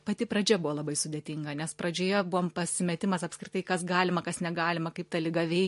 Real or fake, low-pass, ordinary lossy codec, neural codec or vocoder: real; 14.4 kHz; MP3, 48 kbps; none